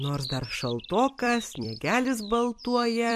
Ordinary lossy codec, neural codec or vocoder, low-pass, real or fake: MP3, 64 kbps; vocoder, 44.1 kHz, 128 mel bands every 256 samples, BigVGAN v2; 14.4 kHz; fake